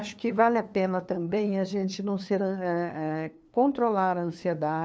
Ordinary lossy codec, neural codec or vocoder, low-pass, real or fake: none; codec, 16 kHz, 2 kbps, FunCodec, trained on LibriTTS, 25 frames a second; none; fake